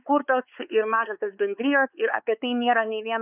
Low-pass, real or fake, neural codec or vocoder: 3.6 kHz; fake; codec, 16 kHz, 4 kbps, X-Codec, WavLM features, trained on Multilingual LibriSpeech